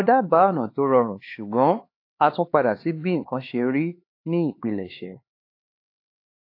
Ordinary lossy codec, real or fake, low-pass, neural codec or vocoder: AAC, 32 kbps; fake; 5.4 kHz; codec, 16 kHz, 4 kbps, X-Codec, HuBERT features, trained on LibriSpeech